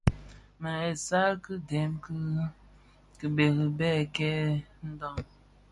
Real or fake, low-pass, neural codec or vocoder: real; 10.8 kHz; none